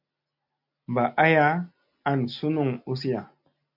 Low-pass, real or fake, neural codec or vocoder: 5.4 kHz; real; none